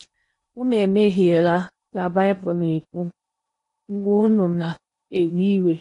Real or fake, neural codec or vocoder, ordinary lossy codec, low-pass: fake; codec, 16 kHz in and 24 kHz out, 0.8 kbps, FocalCodec, streaming, 65536 codes; AAC, 48 kbps; 10.8 kHz